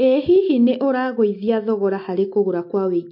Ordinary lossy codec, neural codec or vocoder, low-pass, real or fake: MP3, 32 kbps; none; 5.4 kHz; real